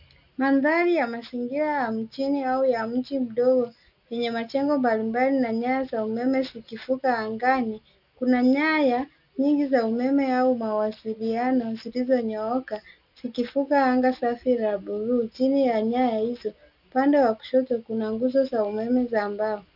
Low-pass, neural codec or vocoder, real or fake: 5.4 kHz; none; real